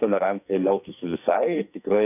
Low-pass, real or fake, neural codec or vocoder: 3.6 kHz; fake; codec, 44.1 kHz, 2.6 kbps, SNAC